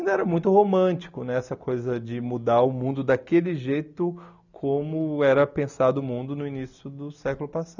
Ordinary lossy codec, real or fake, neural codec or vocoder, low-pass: none; real; none; 7.2 kHz